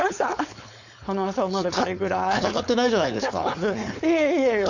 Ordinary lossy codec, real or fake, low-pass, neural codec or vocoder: none; fake; 7.2 kHz; codec, 16 kHz, 4.8 kbps, FACodec